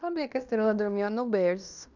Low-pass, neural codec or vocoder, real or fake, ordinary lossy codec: 7.2 kHz; codec, 16 kHz in and 24 kHz out, 0.9 kbps, LongCat-Audio-Codec, fine tuned four codebook decoder; fake; none